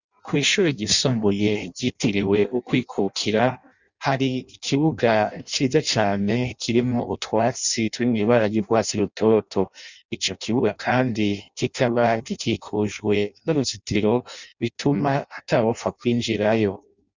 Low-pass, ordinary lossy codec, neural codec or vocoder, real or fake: 7.2 kHz; Opus, 64 kbps; codec, 16 kHz in and 24 kHz out, 0.6 kbps, FireRedTTS-2 codec; fake